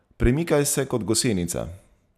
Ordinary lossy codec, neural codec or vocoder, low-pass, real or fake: none; none; 14.4 kHz; real